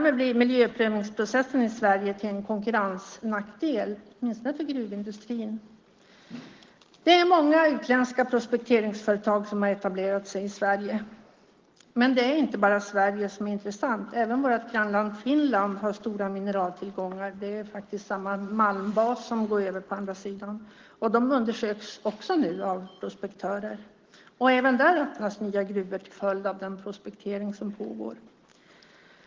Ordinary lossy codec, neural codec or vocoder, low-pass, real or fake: Opus, 16 kbps; none; 7.2 kHz; real